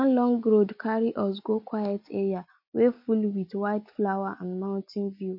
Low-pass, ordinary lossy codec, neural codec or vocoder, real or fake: 5.4 kHz; MP3, 32 kbps; none; real